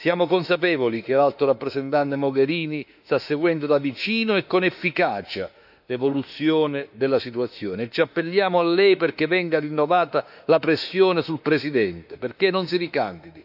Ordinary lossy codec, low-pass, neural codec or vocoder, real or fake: none; 5.4 kHz; autoencoder, 48 kHz, 32 numbers a frame, DAC-VAE, trained on Japanese speech; fake